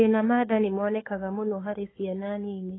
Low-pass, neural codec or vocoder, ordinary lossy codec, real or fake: 7.2 kHz; codec, 44.1 kHz, 7.8 kbps, Pupu-Codec; AAC, 16 kbps; fake